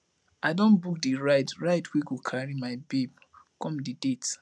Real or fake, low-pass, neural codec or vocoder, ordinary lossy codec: real; none; none; none